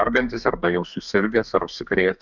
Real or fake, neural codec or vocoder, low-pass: fake; codec, 32 kHz, 1.9 kbps, SNAC; 7.2 kHz